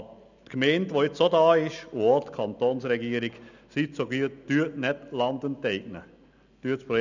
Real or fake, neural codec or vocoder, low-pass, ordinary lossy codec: real; none; 7.2 kHz; none